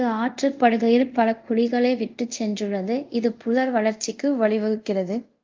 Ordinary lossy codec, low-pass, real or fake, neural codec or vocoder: Opus, 24 kbps; 7.2 kHz; fake; codec, 24 kHz, 0.5 kbps, DualCodec